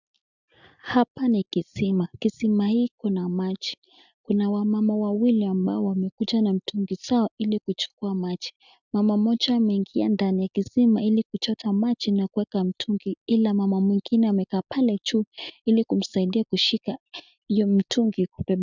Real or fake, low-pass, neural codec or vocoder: real; 7.2 kHz; none